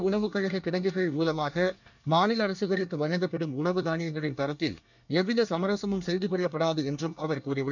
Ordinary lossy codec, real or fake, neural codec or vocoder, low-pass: none; fake; codec, 24 kHz, 1 kbps, SNAC; 7.2 kHz